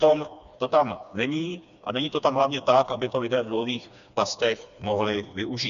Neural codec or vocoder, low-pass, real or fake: codec, 16 kHz, 2 kbps, FreqCodec, smaller model; 7.2 kHz; fake